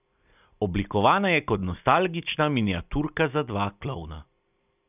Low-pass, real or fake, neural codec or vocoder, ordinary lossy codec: 3.6 kHz; real; none; none